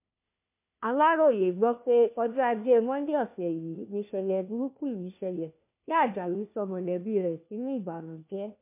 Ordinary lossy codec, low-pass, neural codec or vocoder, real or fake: MP3, 32 kbps; 3.6 kHz; codec, 16 kHz, 1 kbps, FunCodec, trained on LibriTTS, 50 frames a second; fake